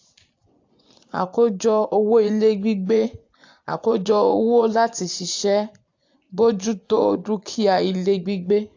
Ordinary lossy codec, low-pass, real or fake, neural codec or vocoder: AAC, 48 kbps; 7.2 kHz; fake; vocoder, 24 kHz, 100 mel bands, Vocos